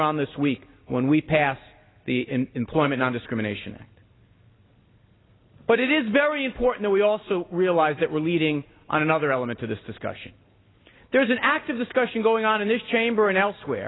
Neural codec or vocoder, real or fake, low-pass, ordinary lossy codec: none; real; 7.2 kHz; AAC, 16 kbps